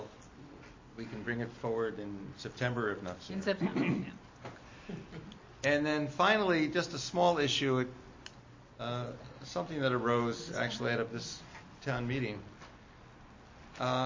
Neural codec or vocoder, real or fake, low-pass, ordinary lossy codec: none; real; 7.2 kHz; MP3, 32 kbps